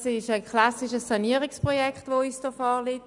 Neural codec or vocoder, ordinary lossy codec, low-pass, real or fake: none; none; 10.8 kHz; real